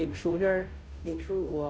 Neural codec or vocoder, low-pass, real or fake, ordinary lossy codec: codec, 16 kHz, 0.5 kbps, FunCodec, trained on Chinese and English, 25 frames a second; none; fake; none